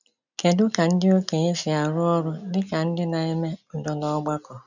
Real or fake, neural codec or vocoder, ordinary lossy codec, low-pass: real; none; none; 7.2 kHz